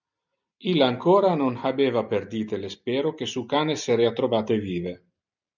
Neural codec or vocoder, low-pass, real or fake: none; 7.2 kHz; real